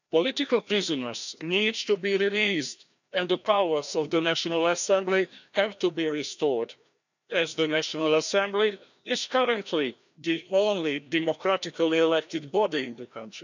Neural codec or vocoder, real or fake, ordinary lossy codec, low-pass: codec, 16 kHz, 1 kbps, FreqCodec, larger model; fake; none; 7.2 kHz